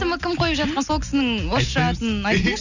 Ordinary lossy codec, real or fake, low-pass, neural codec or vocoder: none; real; 7.2 kHz; none